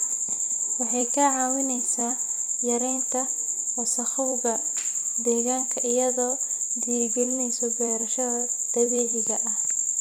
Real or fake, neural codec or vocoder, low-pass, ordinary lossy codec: fake; vocoder, 44.1 kHz, 128 mel bands every 256 samples, BigVGAN v2; none; none